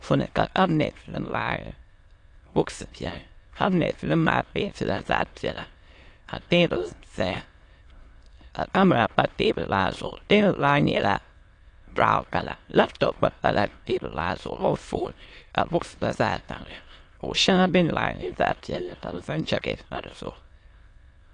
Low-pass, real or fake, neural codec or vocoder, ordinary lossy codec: 9.9 kHz; fake; autoencoder, 22.05 kHz, a latent of 192 numbers a frame, VITS, trained on many speakers; AAC, 48 kbps